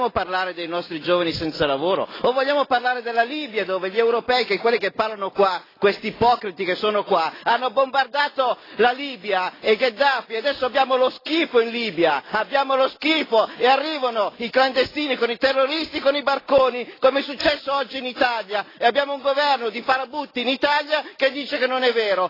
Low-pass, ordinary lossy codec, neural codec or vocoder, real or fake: 5.4 kHz; AAC, 24 kbps; none; real